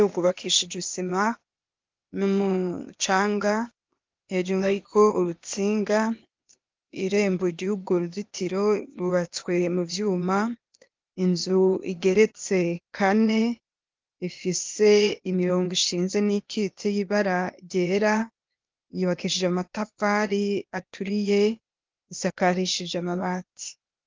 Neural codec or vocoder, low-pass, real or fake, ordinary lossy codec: codec, 16 kHz, 0.8 kbps, ZipCodec; 7.2 kHz; fake; Opus, 24 kbps